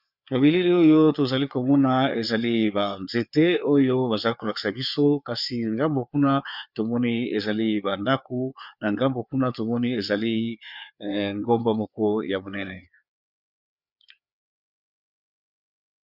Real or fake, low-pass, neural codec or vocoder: fake; 5.4 kHz; codec, 16 kHz, 4 kbps, FreqCodec, larger model